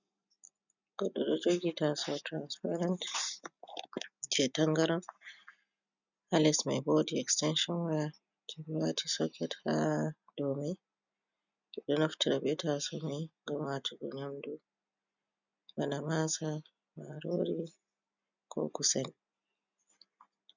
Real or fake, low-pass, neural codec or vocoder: real; 7.2 kHz; none